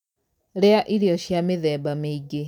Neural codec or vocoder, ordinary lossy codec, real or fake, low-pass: none; none; real; 19.8 kHz